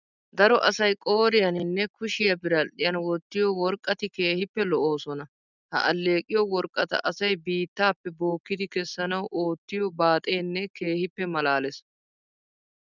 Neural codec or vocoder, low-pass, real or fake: none; 7.2 kHz; real